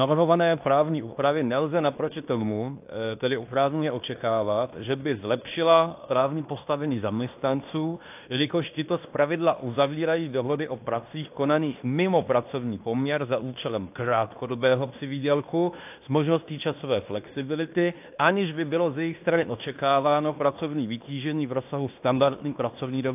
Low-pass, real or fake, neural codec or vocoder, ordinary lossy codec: 3.6 kHz; fake; codec, 16 kHz in and 24 kHz out, 0.9 kbps, LongCat-Audio-Codec, fine tuned four codebook decoder; AAC, 32 kbps